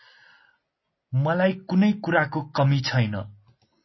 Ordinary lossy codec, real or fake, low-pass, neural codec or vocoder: MP3, 24 kbps; real; 7.2 kHz; none